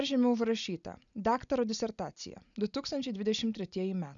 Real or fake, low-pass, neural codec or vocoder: real; 7.2 kHz; none